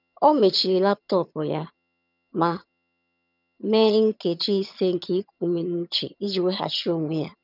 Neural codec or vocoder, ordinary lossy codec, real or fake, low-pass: vocoder, 22.05 kHz, 80 mel bands, HiFi-GAN; none; fake; 5.4 kHz